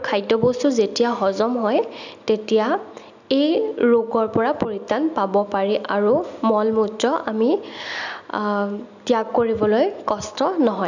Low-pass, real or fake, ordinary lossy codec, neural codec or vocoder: 7.2 kHz; real; none; none